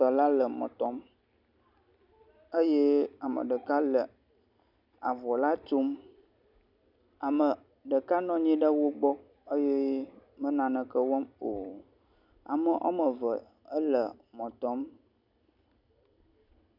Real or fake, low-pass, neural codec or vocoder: real; 5.4 kHz; none